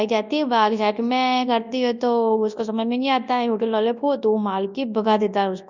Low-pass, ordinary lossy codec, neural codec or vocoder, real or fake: 7.2 kHz; none; codec, 24 kHz, 0.9 kbps, WavTokenizer, large speech release; fake